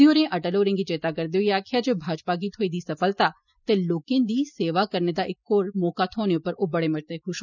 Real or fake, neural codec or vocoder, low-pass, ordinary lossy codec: real; none; 7.2 kHz; none